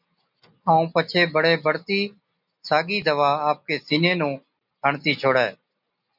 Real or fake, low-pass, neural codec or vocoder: real; 5.4 kHz; none